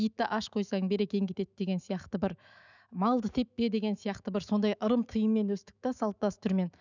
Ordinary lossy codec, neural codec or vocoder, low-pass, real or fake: none; autoencoder, 48 kHz, 128 numbers a frame, DAC-VAE, trained on Japanese speech; 7.2 kHz; fake